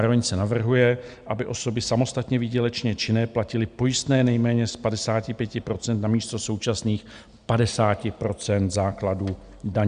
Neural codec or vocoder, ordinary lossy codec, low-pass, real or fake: none; Opus, 64 kbps; 9.9 kHz; real